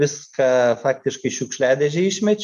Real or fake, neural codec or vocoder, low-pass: real; none; 14.4 kHz